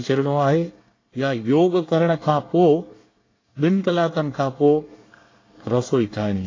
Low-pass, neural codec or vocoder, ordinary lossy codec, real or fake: 7.2 kHz; codec, 24 kHz, 1 kbps, SNAC; AAC, 32 kbps; fake